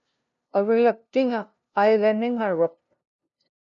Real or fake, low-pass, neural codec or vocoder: fake; 7.2 kHz; codec, 16 kHz, 0.5 kbps, FunCodec, trained on LibriTTS, 25 frames a second